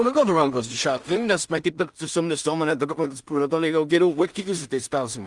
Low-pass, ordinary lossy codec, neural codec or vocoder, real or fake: 10.8 kHz; Opus, 32 kbps; codec, 16 kHz in and 24 kHz out, 0.4 kbps, LongCat-Audio-Codec, two codebook decoder; fake